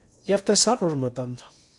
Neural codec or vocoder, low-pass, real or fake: codec, 16 kHz in and 24 kHz out, 0.8 kbps, FocalCodec, streaming, 65536 codes; 10.8 kHz; fake